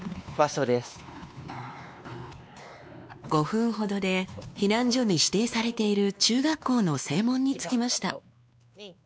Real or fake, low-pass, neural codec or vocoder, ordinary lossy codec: fake; none; codec, 16 kHz, 2 kbps, X-Codec, WavLM features, trained on Multilingual LibriSpeech; none